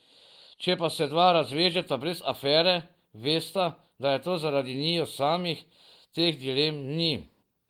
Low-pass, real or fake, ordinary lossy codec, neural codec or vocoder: 19.8 kHz; real; Opus, 24 kbps; none